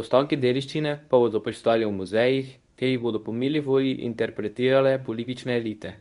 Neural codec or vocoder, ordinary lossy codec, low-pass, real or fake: codec, 24 kHz, 0.9 kbps, WavTokenizer, medium speech release version 1; none; 10.8 kHz; fake